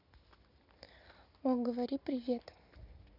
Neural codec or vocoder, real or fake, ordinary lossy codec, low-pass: none; real; none; 5.4 kHz